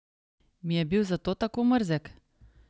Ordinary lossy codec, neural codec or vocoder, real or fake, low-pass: none; none; real; none